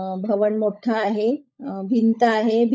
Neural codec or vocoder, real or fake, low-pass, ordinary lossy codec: codec, 16 kHz, 16 kbps, FunCodec, trained on LibriTTS, 50 frames a second; fake; none; none